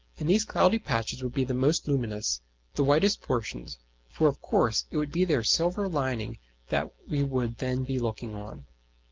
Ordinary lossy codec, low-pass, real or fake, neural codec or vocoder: Opus, 16 kbps; 7.2 kHz; real; none